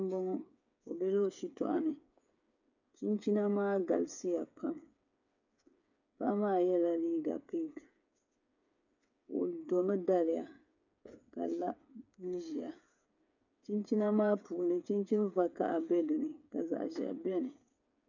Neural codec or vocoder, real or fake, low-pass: codec, 16 kHz, 8 kbps, FreqCodec, smaller model; fake; 7.2 kHz